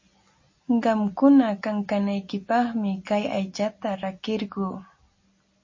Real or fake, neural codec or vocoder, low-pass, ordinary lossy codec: real; none; 7.2 kHz; MP3, 32 kbps